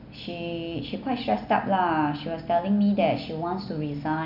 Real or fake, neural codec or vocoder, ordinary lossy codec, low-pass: real; none; none; 5.4 kHz